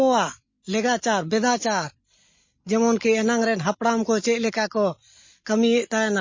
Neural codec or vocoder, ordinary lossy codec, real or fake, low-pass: none; MP3, 32 kbps; real; 7.2 kHz